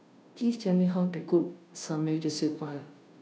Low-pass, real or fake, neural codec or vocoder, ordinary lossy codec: none; fake; codec, 16 kHz, 0.5 kbps, FunCodec, trained on Chinese and English, 25 frames a second; none